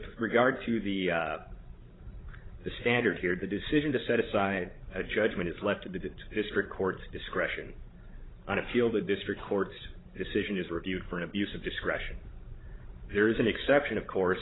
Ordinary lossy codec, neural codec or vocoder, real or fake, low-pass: AAC, 16 kbps; codec, 16 kHz, 16 kbps, FreqCodec, larger model; fake; 7.2 kHz